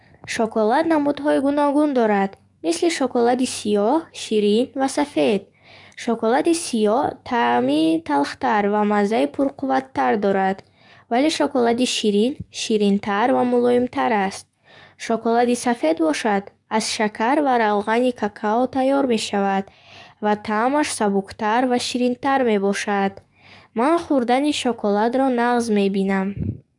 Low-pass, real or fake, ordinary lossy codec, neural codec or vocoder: 10.8 kHz; fake; none; codec, 44.1 kHz, 7.8 kbps, DAC